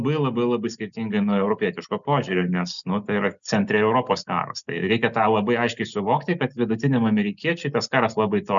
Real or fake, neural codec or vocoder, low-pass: real; none; 7.2 kHz